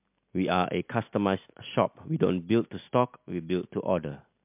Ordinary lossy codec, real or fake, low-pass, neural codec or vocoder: MP3, 32 kbps; real; 3.6 kHz; none